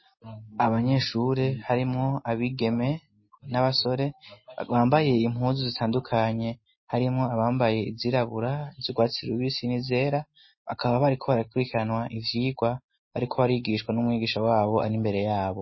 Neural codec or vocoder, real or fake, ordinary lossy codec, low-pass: none; real; MP3, 24 kbps; 7.2 kHz